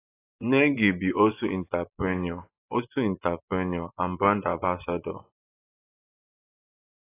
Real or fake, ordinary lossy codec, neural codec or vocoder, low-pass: fake; AAC, 24 kbps; vocoder, 24 kHz, 100 mel bands, Vocos; 3.6 kHz